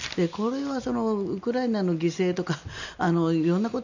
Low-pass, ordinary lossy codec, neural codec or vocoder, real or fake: 7.2 kHz; none; none; real